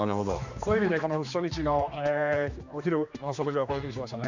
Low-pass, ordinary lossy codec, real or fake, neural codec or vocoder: 7.2 kHz; none; fake; codec, 16 kHz, 2 kbps, X-Codec, HuBERT features, trained on general audio